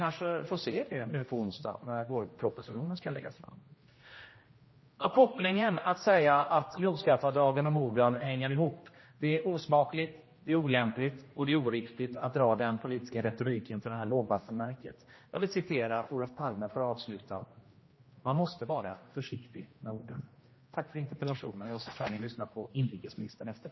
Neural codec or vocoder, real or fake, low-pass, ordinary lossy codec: codec, 16 kHz, 1 kbps, X-Codec, HuBERT features, trained on general audio; fake; 7.2 kHz; MP3, 24 kbps